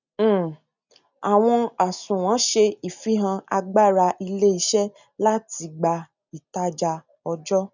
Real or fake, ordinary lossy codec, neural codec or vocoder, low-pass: real; none; none; 7.2 kHz